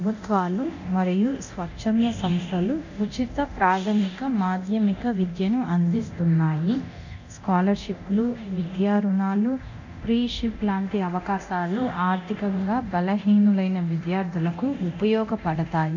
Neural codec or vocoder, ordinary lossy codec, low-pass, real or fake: codec, 24 kHz, 0.9 kbps, DualCodec; none; 7.2 kHz; fake